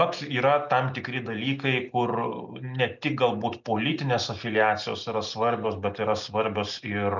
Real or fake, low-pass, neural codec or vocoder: real; 7.2 kHz; none